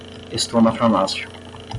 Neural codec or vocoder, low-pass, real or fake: none; 10.8 kHz; real